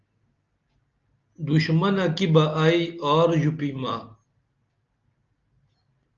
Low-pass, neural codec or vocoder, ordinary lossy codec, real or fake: 7.2 kHz; none; Opus, 24 kbps; real